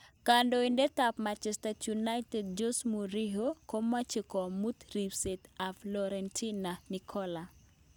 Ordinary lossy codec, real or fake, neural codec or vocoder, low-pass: none; real; none; none